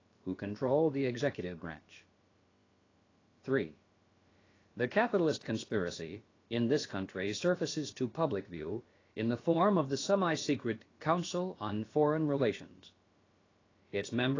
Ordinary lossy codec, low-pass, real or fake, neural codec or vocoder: AAC, 32 kbps; 7.2 kHz; fake; codec, 16 kHz, about 1 kbps, DyCAST, with the encoder's durations